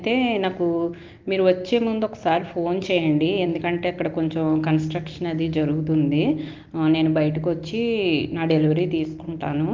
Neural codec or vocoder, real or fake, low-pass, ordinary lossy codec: none; real; 7.2 kHz; Opus, 24 kbps